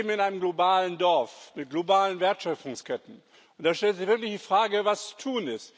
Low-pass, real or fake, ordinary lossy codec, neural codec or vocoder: none; real; none; none